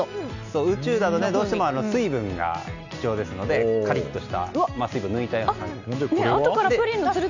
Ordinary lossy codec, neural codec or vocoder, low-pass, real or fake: none; none; 7.2 kHz; real